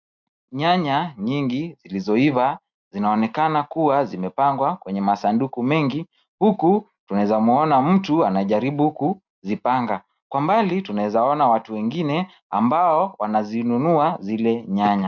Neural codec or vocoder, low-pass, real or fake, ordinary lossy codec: none; 7.2 kHz; real; MP3, 64 kbps